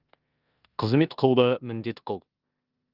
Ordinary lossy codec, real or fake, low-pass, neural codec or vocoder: Opus, 24 kbps; fake; 5.4 kHz; codec, 16 kHz in and 24 kHz out, 0.9 kbps, LongCat-Audio-Codec, four codebook decoder